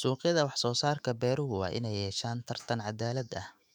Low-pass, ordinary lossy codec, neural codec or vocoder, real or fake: 19.8 kHz; none; autoencoder, 48 kHz, 128 numbers a frame, DAC-VAE, trained on Japanese speech; fake